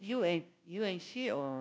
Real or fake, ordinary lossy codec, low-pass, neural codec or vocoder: fake; none; none; codec, 16 kHz, 0.5 kbps, FunCodec, trained on Chinese and English, 25 frames a second